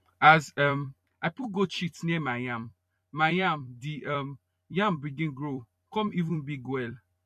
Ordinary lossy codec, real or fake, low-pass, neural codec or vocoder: MP3, 64 kbps; fake; 14.4 kHz; vocoder, 44.1 kHz, 128 mel bands every 256 samples, BigVGAN v2